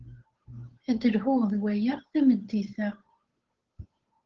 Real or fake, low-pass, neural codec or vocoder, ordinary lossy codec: fake; 7.2 kHz; codec, 16 kHz, 8 kbps, FunCodec, trained on Chinese and English, 25 frames a second; Opus, 16 kbps